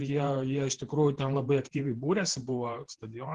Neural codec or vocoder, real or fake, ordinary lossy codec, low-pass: vocoder, 48 kHz, 128 mel bands, Vocos; fake; Opus, 16 kbps; 10.8 kHz